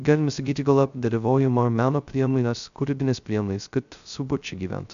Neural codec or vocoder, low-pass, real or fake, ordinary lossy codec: codec, 16 kHz, 0.2 kbps, FocalCodec; 7.2 kHz; fake; AAC, 96 kbps